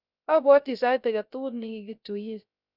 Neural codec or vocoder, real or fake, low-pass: codec, 16 kHz, 0.3 kbps, FocalCodec; fake; 5.4 kHz